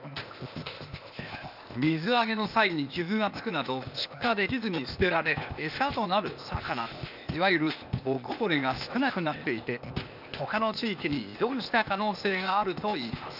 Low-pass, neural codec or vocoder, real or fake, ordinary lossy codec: 5.4 kHz; codec, 16 kHz, 0.8 kbps, ZipCodec; fake; none